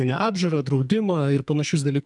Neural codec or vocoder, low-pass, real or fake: codec, 44.1 kHz, 2.6 kbps, SNAC; 10.8 kHz; fake